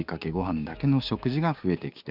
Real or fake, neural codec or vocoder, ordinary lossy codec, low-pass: fake; codec, 16 kHz, 6 kbps, DAC; none; 5.4 kHz